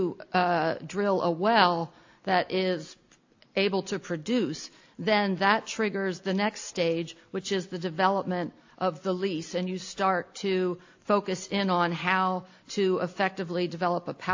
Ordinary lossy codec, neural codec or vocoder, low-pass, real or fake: AAC, 48 kbps; none; 7.2 kHz; real